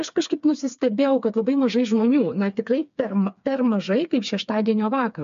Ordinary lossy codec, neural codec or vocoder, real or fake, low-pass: MP3, 64 kbps; codec, 16 kHz, 4 kbps, FreqCodec, smaller model; fake; 7.2 kHz